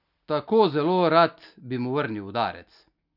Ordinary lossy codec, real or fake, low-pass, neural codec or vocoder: none; real; 5.4 kHz; none